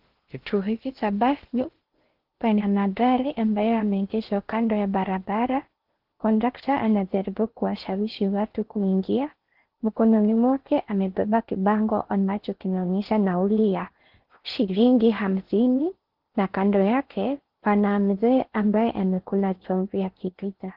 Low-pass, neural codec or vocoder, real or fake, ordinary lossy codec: 5.4 kHz; codec, 16 kHz in and 24 kHz out, 0.6 kbps, FocalCodec, streaming, 2048 codes; fake; Opus, 16 kbps